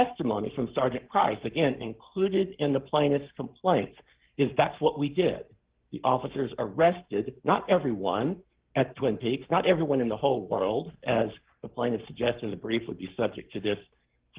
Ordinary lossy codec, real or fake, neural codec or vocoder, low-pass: Opus, 16 kbps; fake; codec, 44.1 kHz, 7.8 kbps, Pupu-Codec; 3.6 kHz